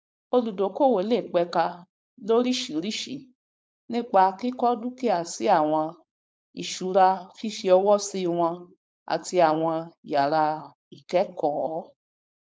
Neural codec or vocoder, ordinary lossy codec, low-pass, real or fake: codec, 16 kHz, 4.8 kbps, FACodec; none; none; fake